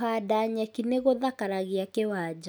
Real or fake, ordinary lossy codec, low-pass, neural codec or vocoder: real; none; none; none